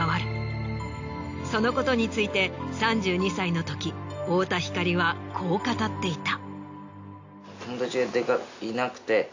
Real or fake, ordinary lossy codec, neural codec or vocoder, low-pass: real; AAC, 48 kbps; none; 7.2 kHz